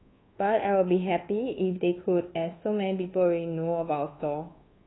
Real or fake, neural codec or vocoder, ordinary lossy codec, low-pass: fake; codec, 24 kHz, 1.2 kbps, DualCodec; AAC, 16 kbps; 7.2 kHz